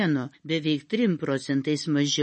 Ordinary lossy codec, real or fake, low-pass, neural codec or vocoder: MP3, 32 kbps; real; 9.9 kHz; none